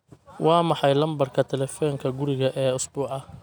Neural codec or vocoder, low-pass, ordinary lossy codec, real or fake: none; none; none; real